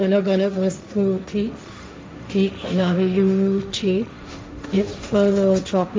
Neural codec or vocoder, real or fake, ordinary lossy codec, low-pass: codec, 16 kHz, 1.1 kbps, Voila-Tokenizer; fake; none; none